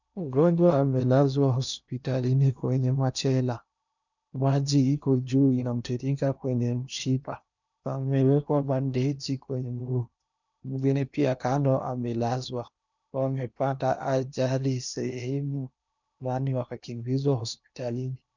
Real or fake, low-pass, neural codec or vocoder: fake; 7.2 kHz; codec, 16 kHz in and 24 kHz out, 0.8 kbps, FocalCodec, streaming, 65536 codes